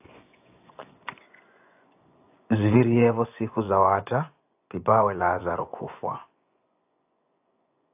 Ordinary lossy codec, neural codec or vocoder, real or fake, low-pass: AAC, 32 kbps; vocoder, 24 kHz, 100 mel bands, Vocos; fake; 3.6 kHz